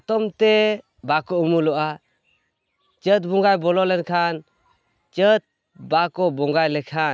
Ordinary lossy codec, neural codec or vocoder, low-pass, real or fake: none; none; none; real